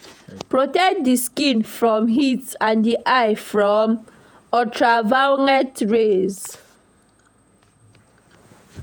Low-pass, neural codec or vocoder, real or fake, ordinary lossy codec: none; vocoder, 48 kHz, 128 mel bands, Vocos; fake; none